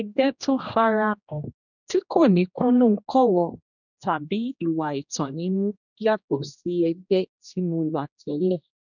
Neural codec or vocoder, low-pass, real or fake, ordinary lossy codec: codec, 16 kHz, 1 kbps, X-Codec, HuBERT features, trained on general audio; 7.2 kHz; fake; none